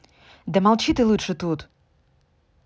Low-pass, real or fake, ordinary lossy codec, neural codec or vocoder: none; real; none; none